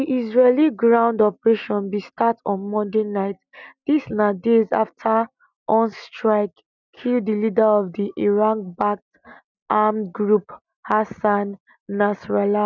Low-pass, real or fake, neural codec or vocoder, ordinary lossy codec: 7.2 kHz; real; none; none